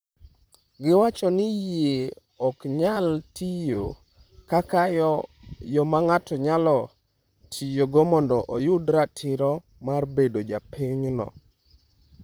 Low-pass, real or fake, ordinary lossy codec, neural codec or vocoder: none; fake; none; vocoder, 44.1 kHz, 128 mel bands, Pupu-Vocoder